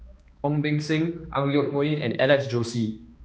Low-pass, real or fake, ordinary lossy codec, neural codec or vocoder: none; fake; none; codec, 16 kHz, 2 kbps, X-Codec, HuBERT features, trained on balanced general audio